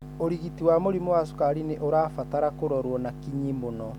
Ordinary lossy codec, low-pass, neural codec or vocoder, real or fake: none; 19.8 kHz; none; real